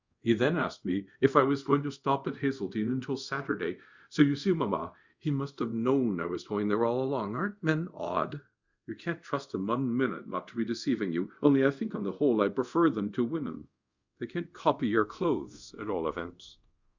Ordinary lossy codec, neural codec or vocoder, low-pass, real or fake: Opus, 64 kbps; codec, 24 kHz, 0.5 kbps, DualCodec; 7.2 kHz; fake